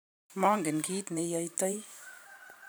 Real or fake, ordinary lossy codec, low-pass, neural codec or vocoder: fake; none; none; vocoder, 44.1 kHz, 128 mel bands every 512 samples, BigVGAN v2